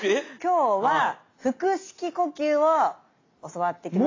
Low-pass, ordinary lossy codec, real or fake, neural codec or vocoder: 7.2 kHz; AAC, 32 kbps; real; none